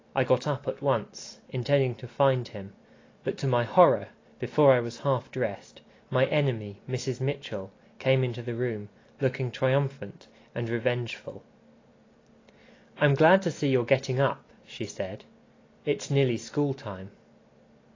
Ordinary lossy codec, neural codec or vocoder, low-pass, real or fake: AAC, 32 kbps; none; 7.2 kHz; real